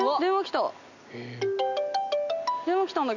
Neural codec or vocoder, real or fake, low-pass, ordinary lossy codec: none; real; 7.2 kHz; none